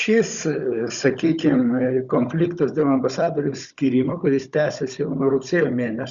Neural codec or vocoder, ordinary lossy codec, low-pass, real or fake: codec, 16 kHz, 16 kbps, FunCodec, trained on Chinese and English, 50 frames a second; Opus, 64 kbps; 7.2 kHz; fake